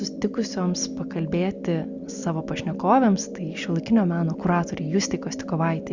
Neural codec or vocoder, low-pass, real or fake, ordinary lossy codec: none; 7.2 kHz; real; Opus, 64 kbps